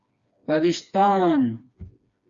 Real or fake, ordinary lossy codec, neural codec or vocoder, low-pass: fake; Opus, 64 kbps; codec, 16 kHz, 2 kbps, FreqCodec, smaller model; 7.2 kHz